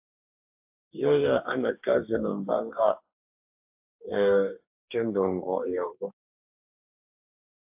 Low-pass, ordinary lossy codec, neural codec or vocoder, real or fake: 3.6 kHz; AAC, 32 kbps; codec, 44.1 kHz, 2.6 kbps, DAC; fake